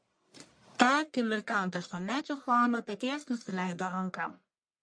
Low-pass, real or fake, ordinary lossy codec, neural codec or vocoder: 9.9 kHz; fake; MP3, 48 kbps; codec, 44.1 kHz, 1.7 kbps, Pupu-Codec